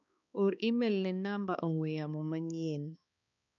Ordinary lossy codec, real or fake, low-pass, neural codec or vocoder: none; fake; 7.2 kHz; codec, 16 kHz, 2 kbps, X-Codec, HuBERT features, trained on balanced general audio